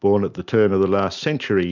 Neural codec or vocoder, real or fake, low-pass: none; real; 7.2 kHz